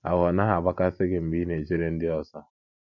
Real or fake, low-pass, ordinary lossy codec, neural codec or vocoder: real; 7.2 kHz; none; none